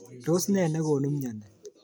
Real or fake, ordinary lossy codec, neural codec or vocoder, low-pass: real; none; none; none